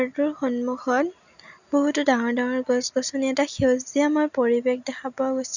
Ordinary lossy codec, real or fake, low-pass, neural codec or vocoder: none; real; 7.2 kHz; none